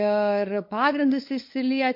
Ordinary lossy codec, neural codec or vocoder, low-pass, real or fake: MP3, 32 kbps; codec, 16 kHz, 8 kbps, FunCodec, trained on LibriTTS, 25 frames a second; 5.4 kHz; fake